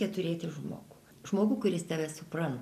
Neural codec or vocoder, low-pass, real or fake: none; 14.4 kHz; real